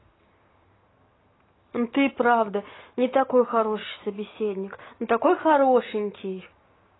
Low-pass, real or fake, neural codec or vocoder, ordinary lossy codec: 7.2 kHz; real; none; AAC, 16 kbps